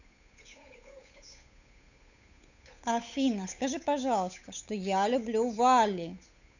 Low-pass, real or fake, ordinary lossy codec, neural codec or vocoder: 7.2 kHz; fake; none; codec, 16 kHz, 8 kbps, FunCodec, trained on Chinese and English, 25 frames a second